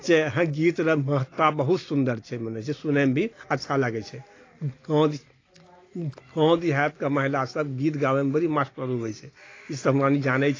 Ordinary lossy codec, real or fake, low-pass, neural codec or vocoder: AAC, 32 kbps; real; 7.2 kHz; none